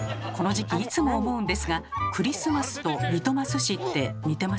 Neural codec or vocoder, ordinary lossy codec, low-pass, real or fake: none; none; none; real